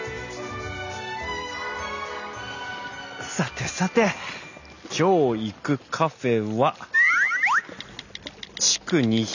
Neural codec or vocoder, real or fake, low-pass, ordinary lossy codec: none; real; 7.2 kHz; none